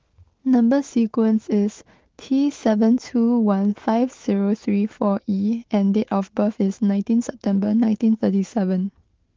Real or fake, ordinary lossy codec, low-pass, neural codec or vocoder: fake; Opus, 16 kbps; 7.2 kHz; autoencoder, 48 kHz, 128 numbers a frame, DAC-VAE, trained on Japanese speech